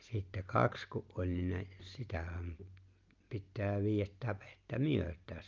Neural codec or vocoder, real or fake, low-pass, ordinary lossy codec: none; real; 7.2 kHz; Opus, 32 kbps